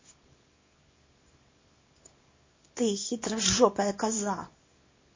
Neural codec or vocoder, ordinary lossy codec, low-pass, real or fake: codec, 24 kHz, 0.9 kbps, WavTokenizer, small release; MP3, 32 kbps; 7.2 kHz; fake